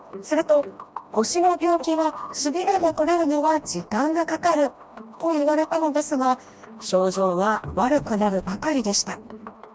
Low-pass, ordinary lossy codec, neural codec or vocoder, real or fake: none; none; codec, 16 kHz, 1 kbps, FreqCodec, smaller model; fake